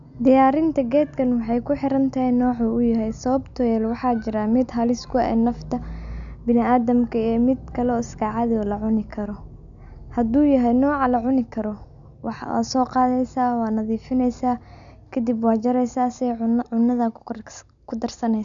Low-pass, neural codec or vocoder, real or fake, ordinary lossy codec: 7.2 kHz; none; real; none